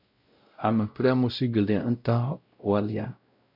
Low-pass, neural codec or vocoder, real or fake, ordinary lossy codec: 5.4 kHz; codec, 16 kHz, 0.5 kbps, X-Codec, WavLM features, trained on Multilingual LibriSpeech; fake; MP3, 48 kbps